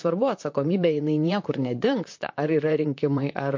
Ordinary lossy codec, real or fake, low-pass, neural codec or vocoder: MP3, 48 kbps; fake; 7.2 kHz; vocoder, 44.1 kHz, 128 mel bands, Pupu-Vocoder